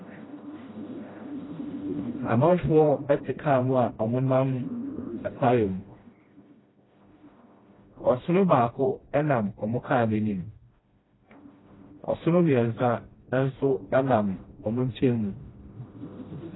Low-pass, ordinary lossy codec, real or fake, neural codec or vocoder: 7.2 kHz; AAC, 16 kbps; fake; codec, 16 kHz, 1 kbps, FreqCodec, smaller model